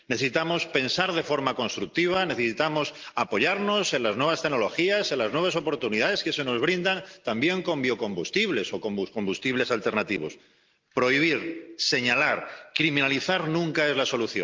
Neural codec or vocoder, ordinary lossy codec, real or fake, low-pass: none; Opus, 32 kbps; real; 7.2 kHz